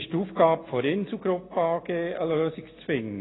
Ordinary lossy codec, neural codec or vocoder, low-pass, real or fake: AAC, 16 kbps; none; 7.2 kHz; real